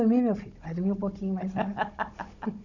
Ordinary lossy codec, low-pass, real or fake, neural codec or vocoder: none; 7.2 kHz; fake; codec, 16 kHz, 16 kbps, FunCodec, trained on Chinese and English, 50 frames a second